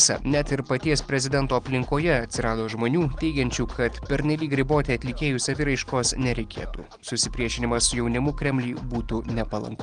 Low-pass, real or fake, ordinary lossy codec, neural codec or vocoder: 9.9 kHz; real; Opus, 24 kbps; none